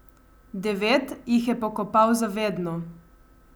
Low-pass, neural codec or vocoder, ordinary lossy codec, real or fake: none; none; none; real